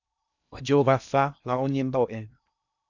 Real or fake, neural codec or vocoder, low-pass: fake; codec, 16 kHz in and 24 kHz out, 0.6 kbps, FocalCodec, streaming, 2048 codes; 7.2 kHz